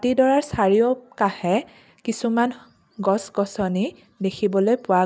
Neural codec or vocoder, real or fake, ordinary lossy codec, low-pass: none; real; none; none